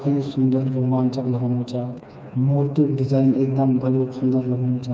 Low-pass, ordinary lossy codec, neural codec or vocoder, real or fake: none; none; codec, 16 kHz, 2 kbps, FreqCodec, smaller model; fake